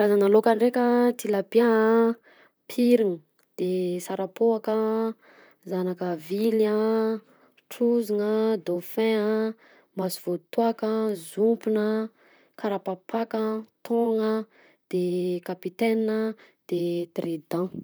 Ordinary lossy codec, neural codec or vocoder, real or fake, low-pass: none; vocoder, 44.1 kHz, 128 mel bands, Pupu-Vocoder; fake; none